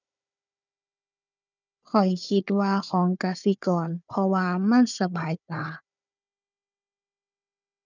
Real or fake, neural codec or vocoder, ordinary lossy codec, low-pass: fake; codec, 16 kHz, 4 kbps, FunCodec, trained on Chinese and English, 50 frames a second; none; 7.2 kHz